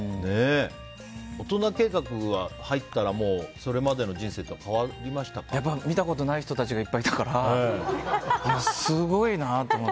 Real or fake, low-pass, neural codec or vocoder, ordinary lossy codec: real; none; none; none